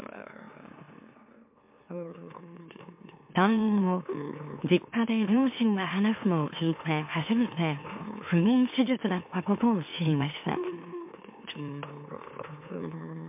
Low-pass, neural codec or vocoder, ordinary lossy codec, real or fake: 3.6 kHz; autoencoder, 44.1 kHz, a latent of 192 numbers a frame, MeloTTS; MP3, 32 kbps; fake